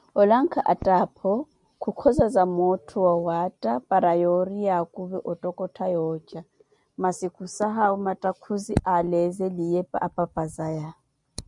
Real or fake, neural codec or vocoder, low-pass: real; none; 10.8 kHz